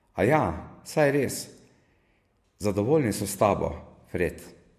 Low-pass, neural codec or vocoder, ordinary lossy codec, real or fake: 14.4 kHz; none; MP3, 64 kbps; real